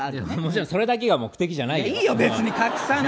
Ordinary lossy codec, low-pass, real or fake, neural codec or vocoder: none; none; real; none